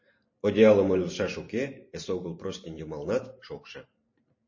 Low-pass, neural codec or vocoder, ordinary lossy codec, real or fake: 7.2 kHz; vocoder, 44.1 kHz, 128 mel bands every 512 samples, BigVGAN v2; MP3, 32 kbps; fake